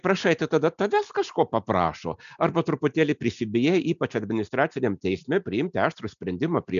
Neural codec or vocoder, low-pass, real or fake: none; 7.2 kHz; real